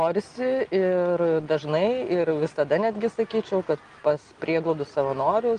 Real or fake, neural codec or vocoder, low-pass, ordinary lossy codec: real; none; 9.9 kHz; Opus, 24 kbps